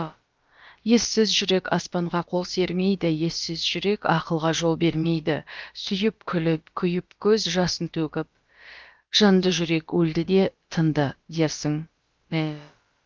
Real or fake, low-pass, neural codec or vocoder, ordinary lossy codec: fake; 7.2 kHz; codec, 16 kHz, about 1 kbps, DyCAST, with the encoder's durations; Opus, 32 kbps